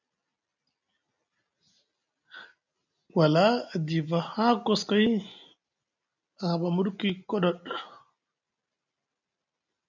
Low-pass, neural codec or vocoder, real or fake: 7.2 kHz; none; real